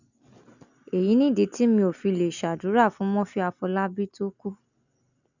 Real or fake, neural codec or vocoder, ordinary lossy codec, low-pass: real; none; none; 7.2 kHz